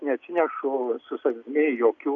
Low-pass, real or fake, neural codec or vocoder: 7.2 kHz; real; none